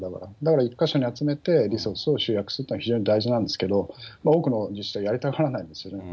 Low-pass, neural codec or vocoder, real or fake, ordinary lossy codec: none; none; real; none